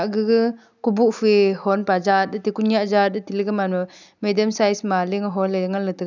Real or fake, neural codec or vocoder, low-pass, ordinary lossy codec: real; none; 7.2 kHz; none